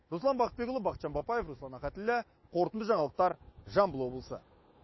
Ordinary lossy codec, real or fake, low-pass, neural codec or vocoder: MP3, 24 kbps; fake; 7.2 kHz; autoencoder, 48 kHz, 128 numbers a frame, DAC-VAE, trained on Japanese speech